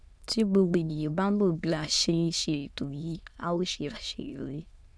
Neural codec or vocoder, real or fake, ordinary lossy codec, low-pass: autoencoder, 22.05 kHz, a latent of 192 numbers a frame, VITS, trained on many speakers; fake; none; none